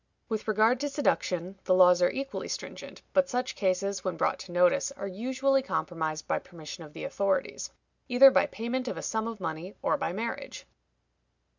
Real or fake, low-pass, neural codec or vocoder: real; 7.2 kHz; none